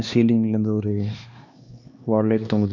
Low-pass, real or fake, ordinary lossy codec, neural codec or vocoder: 7.2 kHz; fake; none; codec, 16 kHz, 2 kbps, X-Codec, HuBERT features, trained on LibriSpeech